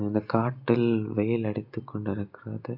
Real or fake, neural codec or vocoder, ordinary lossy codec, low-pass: real; none; none; 5.4 kHz